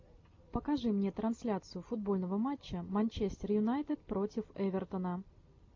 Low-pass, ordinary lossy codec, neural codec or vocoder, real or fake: 7.2 kHz; MP3, 48 kbps; none; real